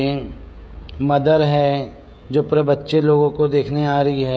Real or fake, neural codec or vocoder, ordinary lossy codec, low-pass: fake; codec, 16 kHz, 16 kbps, FreqCodec, smaller model; none; none